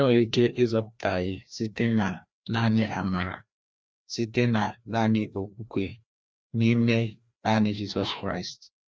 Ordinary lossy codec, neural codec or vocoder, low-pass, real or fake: none; codec, 16 kHz, 1 kbps, FreqCodec, larger model; none; fake